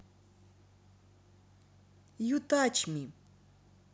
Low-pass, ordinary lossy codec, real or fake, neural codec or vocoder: none; none; real; none